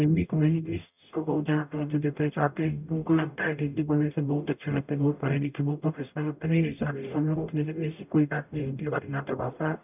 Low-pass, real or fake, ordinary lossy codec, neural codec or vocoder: 3.6 kHz; fake; none; codec, 44.1 kHz, 0.9 kbps, DAC